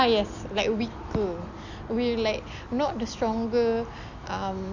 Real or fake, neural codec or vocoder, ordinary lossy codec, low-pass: real; none; none; 7.2 kHz